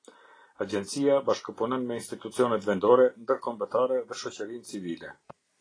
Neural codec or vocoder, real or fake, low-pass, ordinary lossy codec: none; real; 9.9 kHz; AAC, 32 kbps